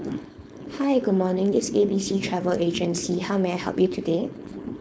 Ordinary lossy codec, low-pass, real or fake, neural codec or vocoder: none; none; fake; codec, 16 kHz, 4.8 kbps, FACodec